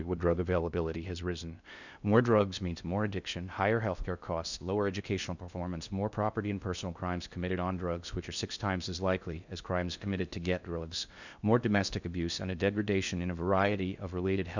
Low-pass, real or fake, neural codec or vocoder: 7.2 kHz; fake; codec, 16 kHz in and 24 kHz out, 0.6 kbps, FocalCodec, streaming, 2048 codes